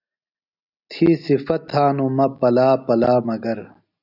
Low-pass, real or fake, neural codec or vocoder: 5.4 kHz; real; none